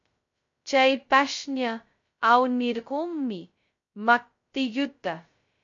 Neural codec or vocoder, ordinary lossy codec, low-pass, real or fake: codec, 16 kHz, 0.2 kbps, FocalCodec; MP3, 48 kbps; 7.2 kHz; fake